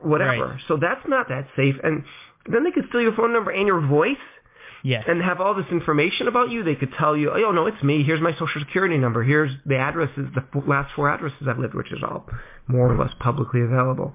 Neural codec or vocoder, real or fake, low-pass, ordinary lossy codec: none; real; 3.6 kHz; MP3, 24 kbps